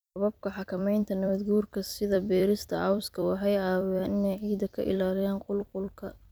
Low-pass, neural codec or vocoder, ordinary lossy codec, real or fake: none; vocoder, 44.1 kHz, 128 mel bands every 256 samples, BigVGAN v2; none; fake